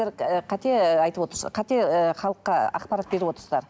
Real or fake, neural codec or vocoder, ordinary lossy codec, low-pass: real; none; none; none